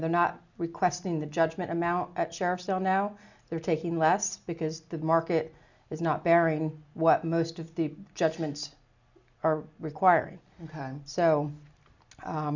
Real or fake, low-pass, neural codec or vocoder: real; 7.2 kHz; none